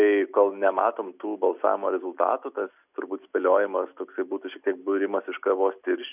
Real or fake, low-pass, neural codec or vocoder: real; 3.6 kHz; none